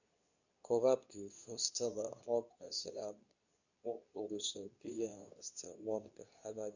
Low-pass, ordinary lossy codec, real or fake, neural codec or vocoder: 7.2 kHz; none; fake; codec, 24 kHz, 0.9 kbps, WavTokenizer, medium speech release version 2